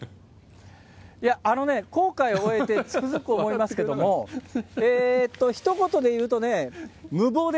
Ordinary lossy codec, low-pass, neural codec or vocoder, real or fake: none; none; none; real